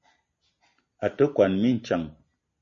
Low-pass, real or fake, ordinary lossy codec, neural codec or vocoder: 7.2 kHz; real; MP3, 32 kbps; none